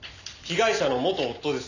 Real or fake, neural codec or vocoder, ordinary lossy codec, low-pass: real; none; none; 7.2 kHz